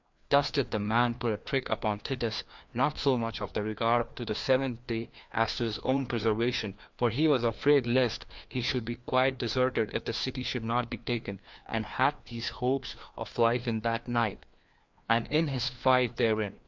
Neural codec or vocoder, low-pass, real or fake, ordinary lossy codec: codec, 16 kHz, 2 kbps, FreqCodec, larger model; 7.2 kHz; fake; MP3, 64 kbps